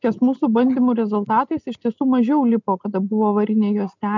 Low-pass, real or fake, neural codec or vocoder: 7.2 kHz; real; none